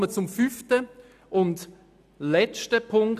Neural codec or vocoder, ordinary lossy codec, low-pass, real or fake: none; none; 14.4 kHz; real